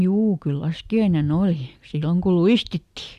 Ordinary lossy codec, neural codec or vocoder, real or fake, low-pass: Opus, 64 kbps; none; real; 14.4 kHz